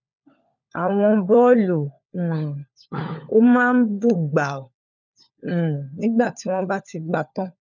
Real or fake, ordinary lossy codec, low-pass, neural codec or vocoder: fake; none; 7.2 kHz; codec, 16 kHz, 4 kbps, FunCodec, trained on LibriTTS, 50 frames a second